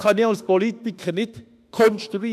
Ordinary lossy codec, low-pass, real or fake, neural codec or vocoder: none; 14.4 kHz; fake; autoencoder, 48 kHz, 32 numbers a frame, DAC-VAE, trained on Japanese speech